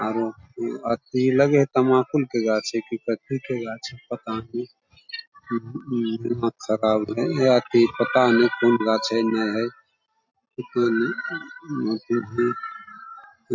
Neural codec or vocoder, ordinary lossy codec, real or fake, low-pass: none; MP3, 64 kbps; real; 7.2 kHz